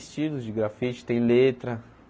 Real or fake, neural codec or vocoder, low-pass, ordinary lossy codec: real; none; none; none